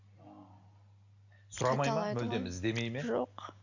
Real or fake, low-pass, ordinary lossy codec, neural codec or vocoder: real; 7.2 kHz; none; none